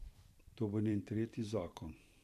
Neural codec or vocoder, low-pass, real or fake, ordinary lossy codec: vocoder, 44.1 kHz, 128 mel bands every 512 samples, BigVGAN v2; 14.4 kHz; fake; none